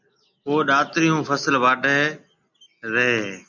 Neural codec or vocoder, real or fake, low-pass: none; real; 7.2 kHz